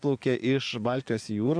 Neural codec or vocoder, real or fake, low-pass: vocoder, 24 kHz, 100 mel bands, Vocos; fake; 9.9 kHz